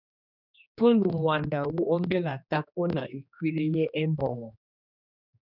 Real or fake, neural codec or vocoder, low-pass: fake; codec, 16 kHz, 2 kbps, X-Codec, HuBERT features, trained on general audio; 5.4 kHz